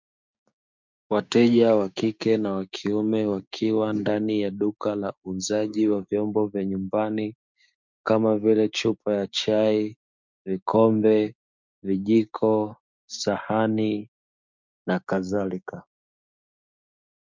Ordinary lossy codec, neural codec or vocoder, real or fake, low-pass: MP3, 64 kbps; codec, 16 kHz, 6 kbps, DAC; fake; 7.2 kHz